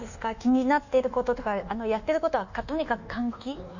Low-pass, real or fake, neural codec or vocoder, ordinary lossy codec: 7.2 kHz; fake; codec, 24 kHz, 1.2 kbps, DualCodec; none